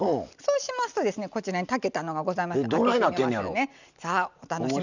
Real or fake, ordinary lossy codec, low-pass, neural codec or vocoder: real; none; 7.2 kHz; none